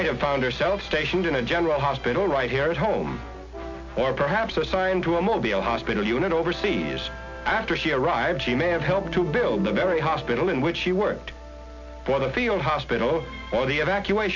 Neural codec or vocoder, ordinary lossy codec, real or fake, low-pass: none; MP3, 48 kbps; real; 7.2 kHz